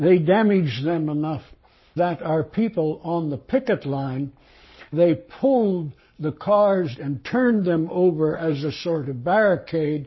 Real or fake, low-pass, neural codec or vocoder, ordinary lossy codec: fake; 7.2 kHz; codec, 44.1 kHz, 7.8 kbps, Pupu-Codec; MP3, 24 kbps